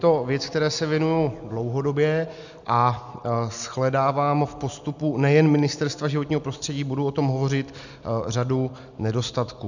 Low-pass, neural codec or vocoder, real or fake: 7.2 kHz; none; real